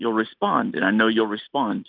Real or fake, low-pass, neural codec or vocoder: real; 5.4 kHz; none